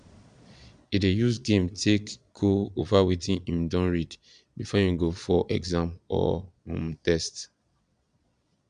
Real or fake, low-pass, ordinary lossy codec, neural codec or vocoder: fake; 9.9 kHz; none; vocoder, 22.05 kHz, 80 mel bands, Vocos